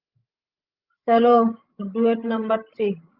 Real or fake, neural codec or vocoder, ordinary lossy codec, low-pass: fake; codec, 16 kHz, 16 kbps, FreqCodec, larger model; Opus, 24 kbps; 5.4 kHz